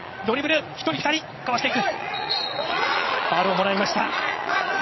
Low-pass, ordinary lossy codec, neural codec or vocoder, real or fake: 7.2 kHz; MP3, 24 kbps; vocoder, 22.05 kHz, 80 mel bands, Vocos; fake